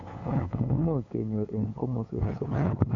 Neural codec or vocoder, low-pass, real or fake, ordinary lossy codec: codec, 16 kHz, 2 kbps, FunCodec, trained on LibriTTS, 25 frames a second; 7.2 kHz; fake; MP3, 64 kbps